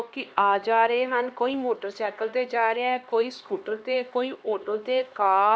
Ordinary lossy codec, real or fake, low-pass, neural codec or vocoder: none; fake; none; codec, 16 kHz, 2 kbps, X-Codec, HuBERT features, trained on LibriSpeech